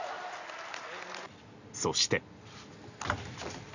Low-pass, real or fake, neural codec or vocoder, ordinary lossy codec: 7.2 kHz; real; none; none